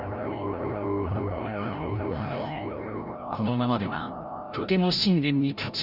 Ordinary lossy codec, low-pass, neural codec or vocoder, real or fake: none; 5.4 kHz; codec, 16 kHz, 0.5 kbps, FreqCodec, larger model; fake